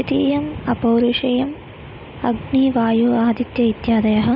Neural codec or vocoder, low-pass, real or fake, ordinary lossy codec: none; 5.4 kHz; real; none